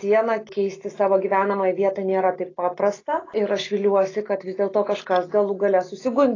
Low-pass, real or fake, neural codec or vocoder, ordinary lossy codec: 7.2 kHz; real; none; AAC, 32 kbps